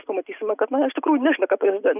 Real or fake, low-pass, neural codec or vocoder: real; 3.6 kHz; none